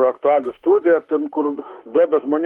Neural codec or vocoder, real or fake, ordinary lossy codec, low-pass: autoencoder, 48 kHz, 32 numbers a frame, DAC-VAE, trained on Japanese speech; fake; Opus, 24 kbps; 19.8 kHz